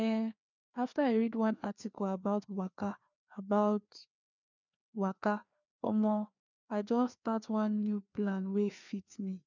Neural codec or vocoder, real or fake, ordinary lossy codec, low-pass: codec, 16 kHz, 2 kbps, FreqCodec, larger model; fake; none; 7.2 kHz